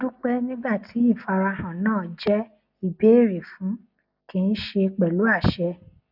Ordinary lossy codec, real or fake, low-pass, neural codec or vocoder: none; real; 5.4 kHz; none